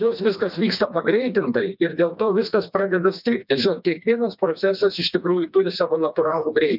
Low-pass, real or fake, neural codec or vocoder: 5.4 kHz; fake; codec, 16 kHz, 2 kbps, FreqCodec, smaller model